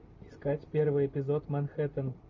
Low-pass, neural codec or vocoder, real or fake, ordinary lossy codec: 7.2 kHz; none; real; Opus, 32 kbps